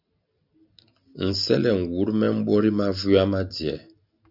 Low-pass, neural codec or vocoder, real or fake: 5.4 kHz; none; real